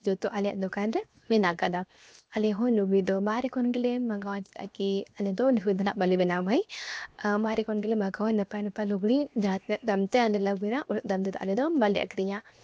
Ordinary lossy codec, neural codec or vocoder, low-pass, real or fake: none; codec, 16 kHz, 0.7 kbps, FocalCodec; none; fake